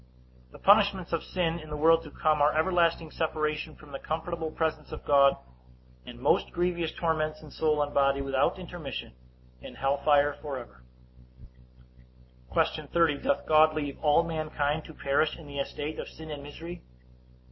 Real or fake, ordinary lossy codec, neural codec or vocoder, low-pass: real; MP3, 24 kbps; none; 7.2 kHz